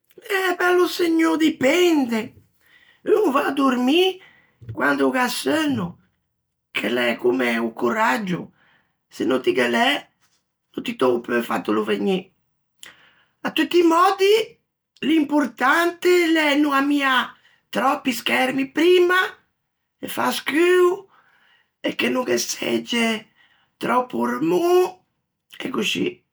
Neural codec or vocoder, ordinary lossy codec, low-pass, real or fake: none; none; none; real